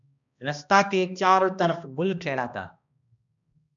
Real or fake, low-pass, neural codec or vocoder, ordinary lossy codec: fake; 7.2 kHz; codec, 16 kHz, 1 kbps, X-Codec, HuBERT features, trained on balanced general audio; MP3, 96 kbps